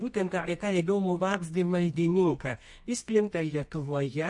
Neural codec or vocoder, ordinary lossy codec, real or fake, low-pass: codec, 24 kHz, 0.9 kbps, WavTokenizer, medium music audio release; MP3, 48 kbps; fake; 10.8 kHz